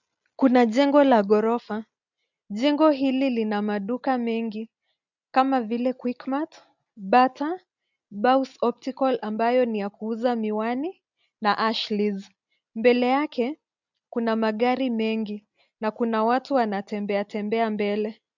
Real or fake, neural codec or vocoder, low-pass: real; none; 7.2 kHz